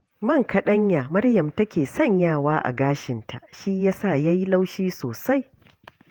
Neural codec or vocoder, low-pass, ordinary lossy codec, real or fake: vocoder, 48 kHz, 128 mel bands, Vocos; 19.8 kHz; Opus, 24 kbps; fake